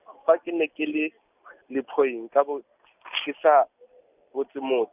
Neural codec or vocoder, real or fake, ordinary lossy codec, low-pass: none; real; none; 3.6 kHz